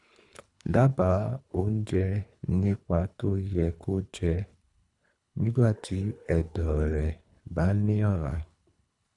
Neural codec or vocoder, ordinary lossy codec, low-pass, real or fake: codec, 24 kHz, 3 kbps, HILCodec; none; 10.8 kHz; fake